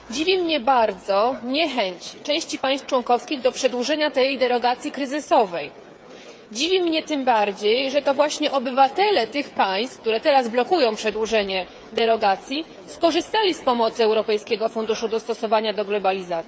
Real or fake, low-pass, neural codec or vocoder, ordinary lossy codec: fake; none; codec, 16 kHz, 8 kbps, FreqCodec, smaller model; none